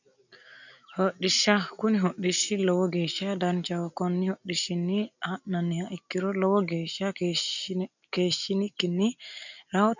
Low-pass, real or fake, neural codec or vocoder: 7.2 kHz; real; none